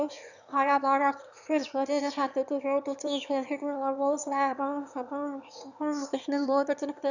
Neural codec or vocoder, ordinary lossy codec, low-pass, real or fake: autoencoder, 22.05 kHz, a latent of 192 numbers a frame, VITS, trained on one speaker; none; 7.2 kHz; fake